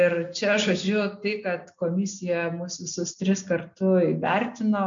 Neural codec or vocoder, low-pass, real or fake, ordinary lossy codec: none; 7.2 kHz; real; MP3, 64 kbps